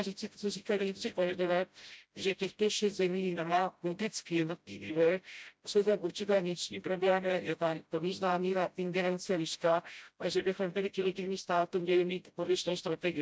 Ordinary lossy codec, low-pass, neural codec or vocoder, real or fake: none; none; codec, 16 kHz, 0.5 kbps, FreqCodec, smaller model; fake